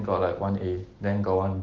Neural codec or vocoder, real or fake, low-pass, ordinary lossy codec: none; real; 7.2 kHz; Opus, 16 kbps